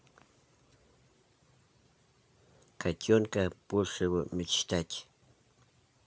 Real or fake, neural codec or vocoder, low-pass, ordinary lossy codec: real; none; none; none